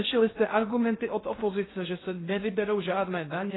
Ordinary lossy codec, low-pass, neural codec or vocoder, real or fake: AAC, 16 kbps; 7.2 kHz; codec, 16 kHz in and 24 kHz out, 0.6 kbps, FocalCodec, streaming, 2048 codes; fake